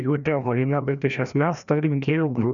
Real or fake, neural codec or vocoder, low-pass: fake; codec, 16 kHz, 1 kbps, FreqCodec, larger model; 7.2 kHz